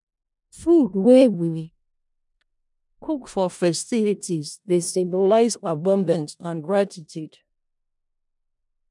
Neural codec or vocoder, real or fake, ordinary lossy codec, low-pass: codec, 16 kHz in and 24 kHz out, 0.4 kbps, LongCat-Audio-Codec, four codebook decoder; fake; none; 10.8 kHz